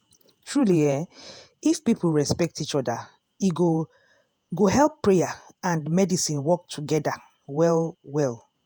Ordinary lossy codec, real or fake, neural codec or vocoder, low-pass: none; fake; vocoder, 48 kHz, 128 mel bands, Vocos; none